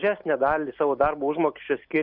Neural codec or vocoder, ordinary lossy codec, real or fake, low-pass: none; Opus, 64 kbps; real; 5.4 kHz